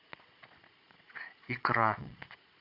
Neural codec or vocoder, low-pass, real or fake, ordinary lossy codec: vocoder, 22.05 kHz, 80 mel bands, Vocos; 5.4 kHz; fake; MP3, 48 kbps